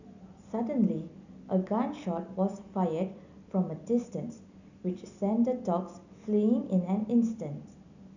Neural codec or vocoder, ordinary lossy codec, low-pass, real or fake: none; none; 7.2 kHz; real